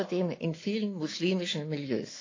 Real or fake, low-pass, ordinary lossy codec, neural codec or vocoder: fake; 7.2 kHz; AAC, 32 kbps; autoencoder, 48 kHz, 128 numbers a frame, DAC-VAE, trained on Japanese speech